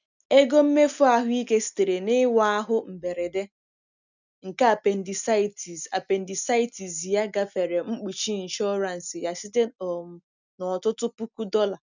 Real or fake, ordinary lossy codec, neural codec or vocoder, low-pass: real; none; none; 7.2 kHz